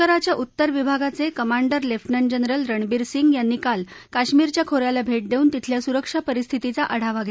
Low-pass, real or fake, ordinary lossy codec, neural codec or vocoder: none; real; none; none